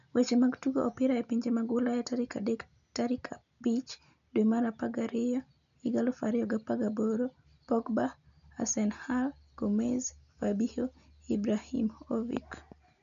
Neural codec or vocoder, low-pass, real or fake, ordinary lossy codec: none; 7.2 kHz; real; AAC, 96 kbps